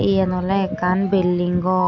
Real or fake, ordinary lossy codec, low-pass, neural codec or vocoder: real; none; 7.2 kHz; none